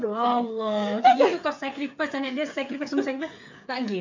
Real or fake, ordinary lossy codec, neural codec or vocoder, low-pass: fake; MP3, 64 kbps; codec, 16 kHz, 8 kbps, FreqCodec, smaller model; 7.2 kHz